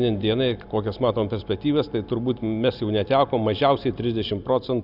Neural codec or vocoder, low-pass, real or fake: none; 5.4 kHz; real